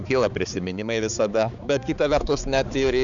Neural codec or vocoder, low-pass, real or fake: codec, 16 kHz, 4 kbps, X-Codec, HuBERT features, trained on balanced general audio; 7.2 kHz; fake